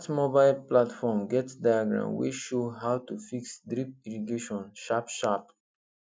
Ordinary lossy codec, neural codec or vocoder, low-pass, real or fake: none; none; none; real